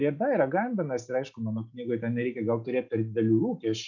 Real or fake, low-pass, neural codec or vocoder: real; 7.2 kHz; none